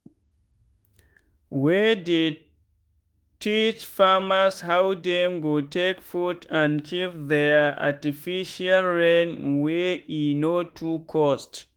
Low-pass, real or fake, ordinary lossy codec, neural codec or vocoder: 19.8 kHz; fake; Opus, 24 kbps; autoencoder, 48 kHz, 32 numbers a frame, DAC-VAE, trained on Japanese speech